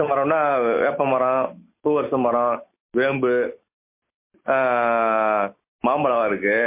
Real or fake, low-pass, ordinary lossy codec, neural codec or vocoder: real; 3.6 kHz; MP3, 24 kbps; none